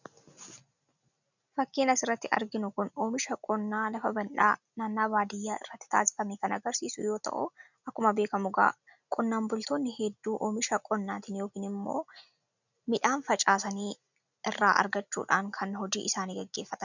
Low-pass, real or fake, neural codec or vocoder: 7.2 kHz; real; none